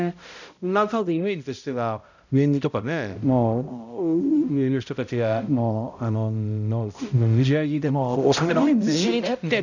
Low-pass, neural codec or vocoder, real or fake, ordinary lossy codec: 7.2 kHz; codec, 16 kHz, 0.5 kbps, X-Codec, HuBERT features, trained on balanced general audio; fake; none